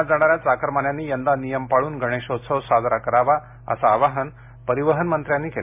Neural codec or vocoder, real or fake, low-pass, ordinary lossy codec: none; real; 3.6 kHz; MP3, 32 kbps